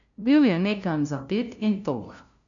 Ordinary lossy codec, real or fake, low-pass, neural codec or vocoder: none; fake; 7.2 kHz; codec, 16 kHz, 0.5 kbps, FunCodec, trained on LibriTTS, 25 frames a second